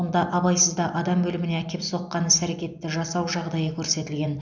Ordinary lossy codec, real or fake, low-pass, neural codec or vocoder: none; real; 7.2 kHz; none